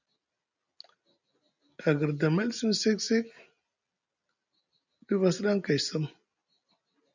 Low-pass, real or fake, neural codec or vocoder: 7.2 kHz; real; none